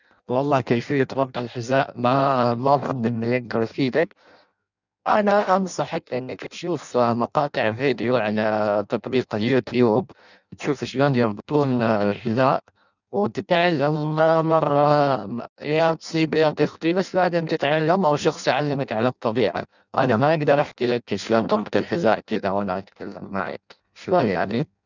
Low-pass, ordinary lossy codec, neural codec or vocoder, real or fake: 7.2 kHz; none; codec, 16 kHz in and 24 kHz out, 0.6 kbps, FireRedTTS-2 codec; fake